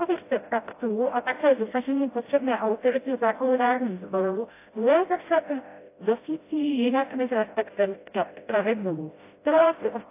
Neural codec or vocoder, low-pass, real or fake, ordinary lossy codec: codec, 16 kHz, 0.5 kbps, FreqCodec, smaller model; 3.6 kHz; fake; AAC, 24 kbps